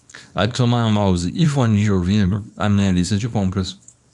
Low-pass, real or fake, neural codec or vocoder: 10.8 kHz; fake; codec, 24 kHz, 0.9 kbps, WavTokenizer, small release